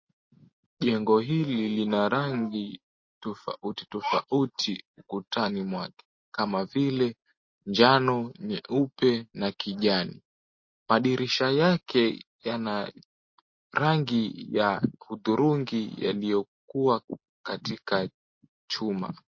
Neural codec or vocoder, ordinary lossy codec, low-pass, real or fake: none; MP3, 32 kbps; 7.2 kHz; real